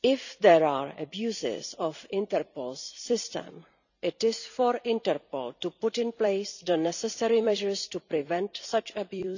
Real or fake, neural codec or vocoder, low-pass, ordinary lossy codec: fake; vocoder, 44.1 kHz, 128 mel bands every 256 samples, BigVGAN v2; 7.2 kHz; none